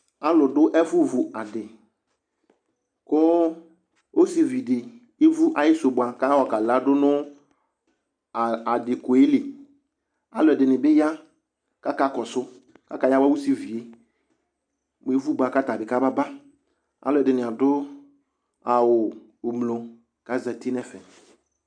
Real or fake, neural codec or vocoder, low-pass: real; none; 9.9 kHz